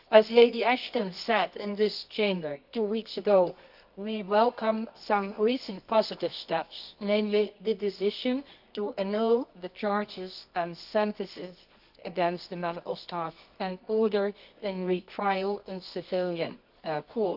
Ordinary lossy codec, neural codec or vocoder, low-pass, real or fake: none; codec, 24 kHz, 0.9 kbps, WavTokenizer, medium music audio release; 5.4 kHz; fake